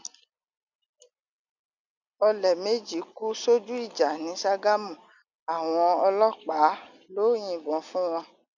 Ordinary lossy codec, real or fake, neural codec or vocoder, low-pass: none; real; none; 7.2 kHz